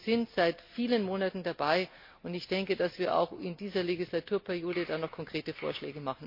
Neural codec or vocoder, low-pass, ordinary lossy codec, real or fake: none; 5.4 kHz; MP3, 32 kbps; real